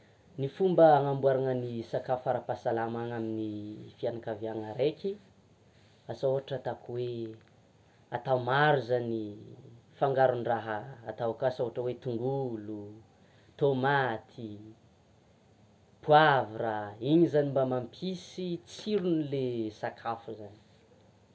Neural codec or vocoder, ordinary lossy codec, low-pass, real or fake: none; none; none; real